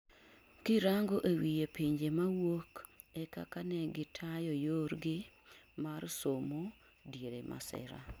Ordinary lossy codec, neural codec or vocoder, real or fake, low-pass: none; none; real; none